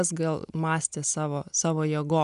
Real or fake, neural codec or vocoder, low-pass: real; none; 10.8 kHz